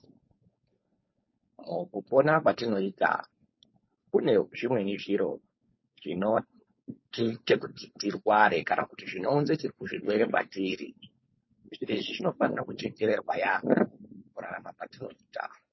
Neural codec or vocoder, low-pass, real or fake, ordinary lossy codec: codec, 16 kHz, 4.8 kbps, FACodec; 7.2 kHz; fake; MP3, 24 kbps